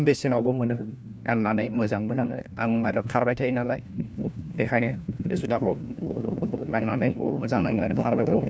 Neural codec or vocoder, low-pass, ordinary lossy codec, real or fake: codec, 16 kHz, 1 kbps, FunCodec, trained on LibriTTS, 50 frames a second; none; none; fake